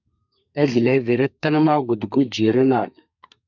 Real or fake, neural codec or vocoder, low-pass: fake; codec, 32 kHz, 1.9 kbps, SNAC; 7.2 kHz